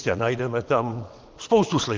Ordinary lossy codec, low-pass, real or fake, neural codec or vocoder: Opus, 32 kbps; 7.2 kHz; fake; vocoder, 22.05 kHz, 80 mel bands, WaveNeXt